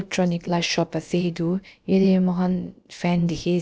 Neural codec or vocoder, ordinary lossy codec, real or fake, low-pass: codec, 16 kHz, about 1 kbps, DyCAST, with the encoder's durations; none; fake; none